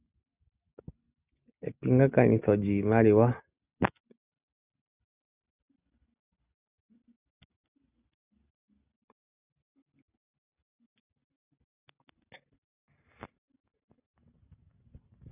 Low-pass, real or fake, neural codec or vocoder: 3.6 kHz; real; none